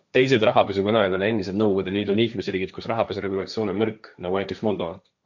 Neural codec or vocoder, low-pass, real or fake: codec, 16 kHz, 1.1 kbps, Voila-Tokenizer; 7.2 kHz; fake